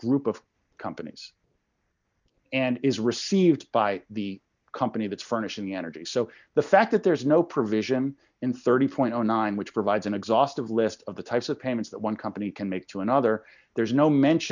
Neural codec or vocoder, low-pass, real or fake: none; 7.2 kHz; real